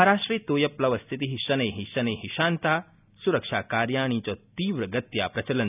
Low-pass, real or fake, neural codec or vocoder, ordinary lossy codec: 3.6 kHz; real; none; none